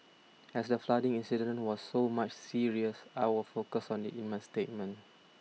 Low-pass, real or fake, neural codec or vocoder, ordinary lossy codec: none; real; none; none